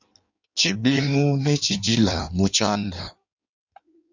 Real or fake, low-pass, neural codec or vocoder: fake; 7.2 kHz; codec, 16 kHz in and 24 kHz out, 1.1 kbps, FireRedTTS-2 codec